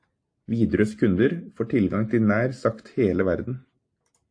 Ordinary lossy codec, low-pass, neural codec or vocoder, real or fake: AAC, 48 kbps; 9.9 kHz; none; real